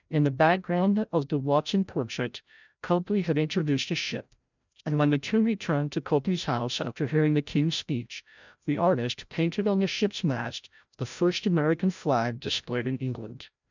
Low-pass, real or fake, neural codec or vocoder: 7.2 kHz; fake; codec, 16 kHz, 0.5 kbps, FreqCodec, larger model